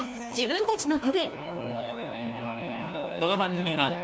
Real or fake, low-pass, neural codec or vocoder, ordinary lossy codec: fake; none; codec, 16 kHz, 1 kbps, FunCodec, trained on LibriTTS, 50 frames a second; none